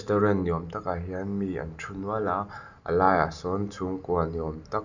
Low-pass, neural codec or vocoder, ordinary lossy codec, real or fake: 7.2 kHz; none; none; real